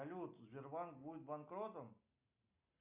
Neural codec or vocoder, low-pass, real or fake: none; 3.6 kHz; real